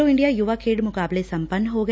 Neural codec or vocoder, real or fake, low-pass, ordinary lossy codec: none; real; none; none